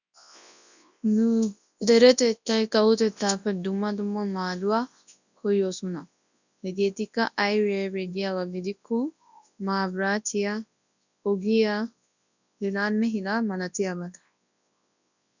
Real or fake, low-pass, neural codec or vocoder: fake; 7.2 kHz; codec, 24 kHz, 0.9 kbps, WavTokenizer, large speech release